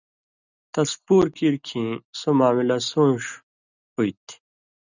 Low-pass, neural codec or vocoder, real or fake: 7.2 kHz; none; real